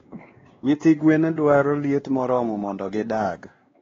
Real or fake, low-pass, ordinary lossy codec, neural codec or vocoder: fake; 7.2 kHz; AAC, 24 kbps; codec, 16 kHz, 2 kbps, X-Codec, WavLM features, trained on Multilingual LibriSpeech